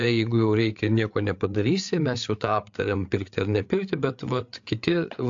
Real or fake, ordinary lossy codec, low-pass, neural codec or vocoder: fake; AAC, 64 kbps; 7.2 kHz; codec, 16 kHz, 8 kbps, FreqCodec, larger model